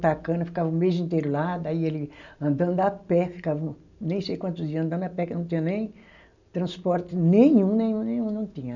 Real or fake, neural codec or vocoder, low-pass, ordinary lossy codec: real; none; 7.2 kHz; none